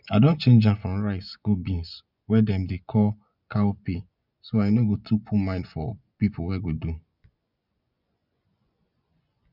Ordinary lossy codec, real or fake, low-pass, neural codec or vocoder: none; fake; 5.4 kHz; vocoder, 24 kHz, 100 mel bands, Vocos